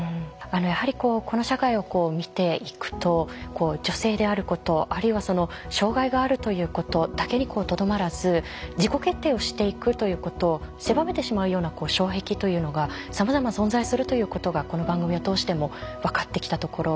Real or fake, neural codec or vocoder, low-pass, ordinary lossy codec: real; none; none; none